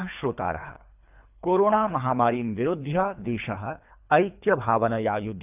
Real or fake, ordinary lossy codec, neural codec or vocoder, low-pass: fake; none; codec, 24 kHz, 3 kbps, HILCodec; 3.6 kHz